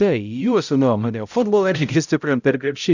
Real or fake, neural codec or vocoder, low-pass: fake; codec, 16 kHz, 0.5 kbps, X-Codec, HuBERT features, trained on balanced general audio; 7.2 kHz